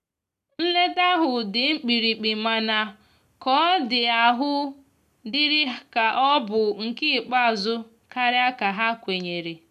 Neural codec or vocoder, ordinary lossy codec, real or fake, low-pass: none; none; real; 14.4 kHz